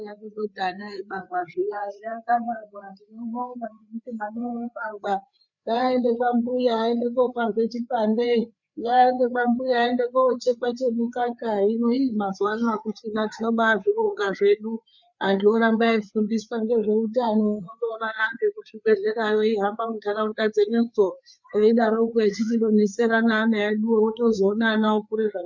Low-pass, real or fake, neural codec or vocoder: 7.2 kHz; fake; codec, 16 kHz, 4 kbps, FreqCodec, larger model